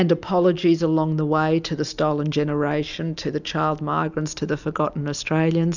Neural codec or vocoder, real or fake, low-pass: none; real; 7.2 kHz